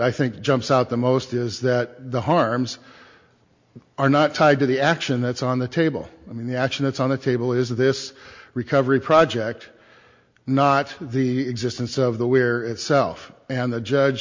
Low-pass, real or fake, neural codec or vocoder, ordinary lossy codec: 7.2 kHz; real; none; MP3, 48 kbps